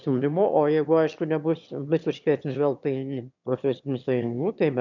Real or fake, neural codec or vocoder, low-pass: fake; autoencoder, 22.05 kHz, a latent of 192 numbers a frame, VITS, trained on one speaker; 7.2 kHz